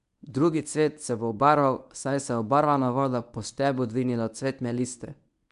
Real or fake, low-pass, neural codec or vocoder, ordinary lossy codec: fake; 10.8 kHz; codec, 24 kHz, 0.9 kbps, WavTokenizer, medium speech release version 1; none